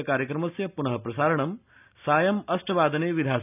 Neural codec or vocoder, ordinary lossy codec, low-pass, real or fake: none; none; 3.6 kHz; real